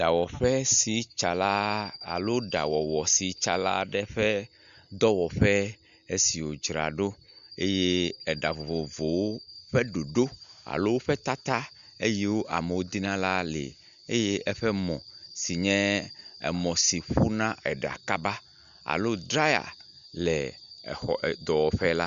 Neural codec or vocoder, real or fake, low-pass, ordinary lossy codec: none; real; 7.2 kHz; Opus, 64 kbps